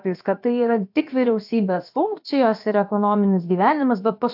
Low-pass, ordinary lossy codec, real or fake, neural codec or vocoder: 5.4 kHz; AAC, 48 kbps; fake; codec, 16 kHz, about 1 kbps, DyCAST, with the encoder's durations